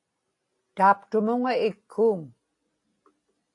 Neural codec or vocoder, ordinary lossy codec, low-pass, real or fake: none; AAC, 48 kbps; 10.8 kHz; real